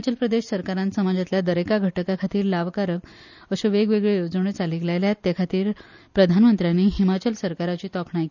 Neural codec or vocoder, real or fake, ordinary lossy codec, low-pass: none; real; none; 7.2 kHz